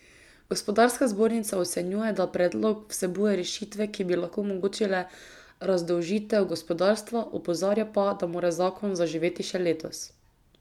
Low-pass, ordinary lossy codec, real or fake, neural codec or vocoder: 19.8 kHz; none; real; none